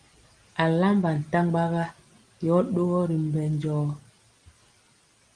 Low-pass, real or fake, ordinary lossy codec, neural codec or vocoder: 9.9 kHz; real; Opus, 24 kbps; none